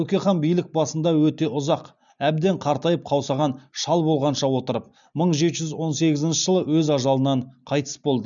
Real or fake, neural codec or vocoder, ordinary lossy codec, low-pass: real; none; none; 7.2 kHz